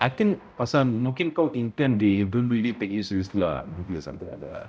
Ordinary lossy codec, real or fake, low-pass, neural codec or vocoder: none; fake; none; codec, 16 kHz, 0.5 kbps, X-Codec, HuBERT features, trained on balanced general audio